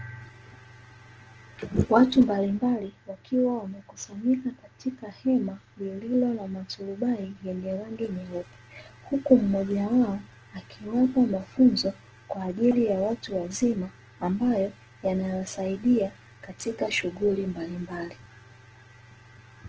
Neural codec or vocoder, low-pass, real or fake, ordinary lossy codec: none; 7.2 kHz; real; Opus, 16 kbps